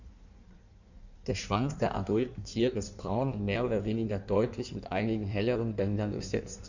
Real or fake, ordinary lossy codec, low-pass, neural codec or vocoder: fake; none; 7.2 kHz; codec, 16 kHz in and 24 kHz out, 1.1 kbps, FireRedTTS-2 codec